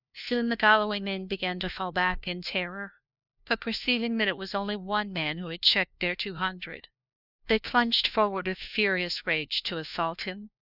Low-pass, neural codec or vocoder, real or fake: 5.4 kHz; codec, 16 kHz, 1 kbps, FunCodec, trained on LibriTTS, 50 frames a second; fake